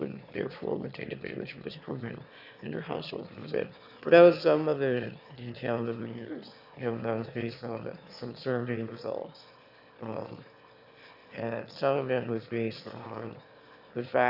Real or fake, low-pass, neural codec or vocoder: fake; 5.4 kHz; autoencoder, 22.05 kHz, a latent of 192 numbers a frame, VITS, trained on one speaker